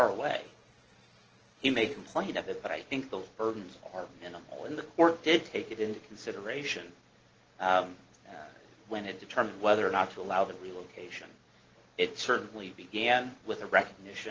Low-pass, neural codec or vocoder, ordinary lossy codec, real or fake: 7.2 kHz; none; Opus, 16 kbps; real